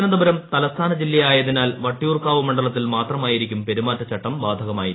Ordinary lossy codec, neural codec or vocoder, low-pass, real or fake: AAC, 16 kbps; none; 7.2 kHz; real